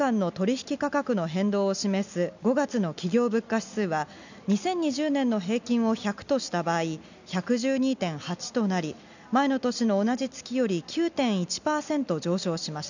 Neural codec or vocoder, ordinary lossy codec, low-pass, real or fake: none; none; 7.2 kHz; real